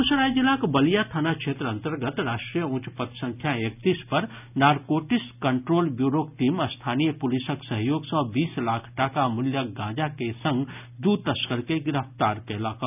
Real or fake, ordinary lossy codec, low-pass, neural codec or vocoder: real; none; 3.6 kHz; none